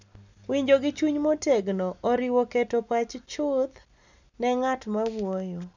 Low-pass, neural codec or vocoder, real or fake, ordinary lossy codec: 7.2 kHz; none; real; none